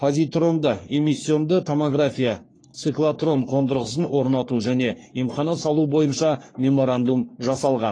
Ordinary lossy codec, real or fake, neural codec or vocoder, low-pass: AAC, 32 kbps; fake; codec, 44.1 kHz, 3.4 kbps, Pupu-Codec; 9.9 kHz